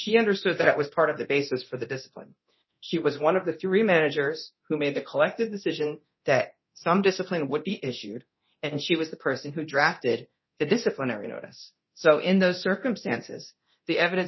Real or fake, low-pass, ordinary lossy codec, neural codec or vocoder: fake; 7.2 kHz; MP3, 24 kbps; codec, 24 kHz, 0.9 kbps, DualCodec